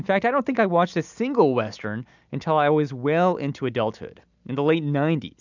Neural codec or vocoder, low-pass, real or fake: none; 7.2 kHz; real